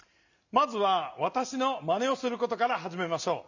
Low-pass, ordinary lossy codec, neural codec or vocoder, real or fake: 7.2 kHz; none; none; real